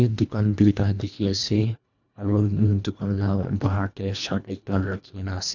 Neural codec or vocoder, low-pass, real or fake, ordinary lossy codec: codec, 24 kHz, 1.5 kbps, HILCodec; 7.2 kHz; fake; none